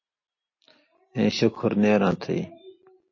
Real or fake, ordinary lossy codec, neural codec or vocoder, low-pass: real; MP3, 32 kbps; none; 7.2 kHz